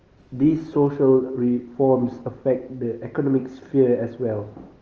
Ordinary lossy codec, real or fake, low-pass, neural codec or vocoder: Opus, 24 kbps; real; 7.2 kHz; none